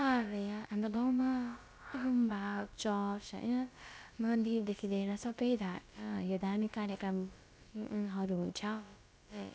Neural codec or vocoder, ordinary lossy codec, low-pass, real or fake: codec, 16 kHz, about 1 kbps, DyCAST, with the encoder's durations; none; none; fake